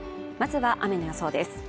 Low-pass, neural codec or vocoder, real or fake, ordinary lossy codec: none; none; real; none